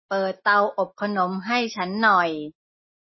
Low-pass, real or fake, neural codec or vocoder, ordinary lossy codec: 7.2 kHz; real; none; MP3, 24 kbps